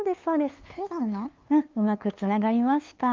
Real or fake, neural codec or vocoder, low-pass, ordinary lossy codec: fake; codec, 16 kHz, 2 kbps, FunCodec, trained on LibriTTS, 25 frames a second; 7.2 kHz; Opus, 24 kbps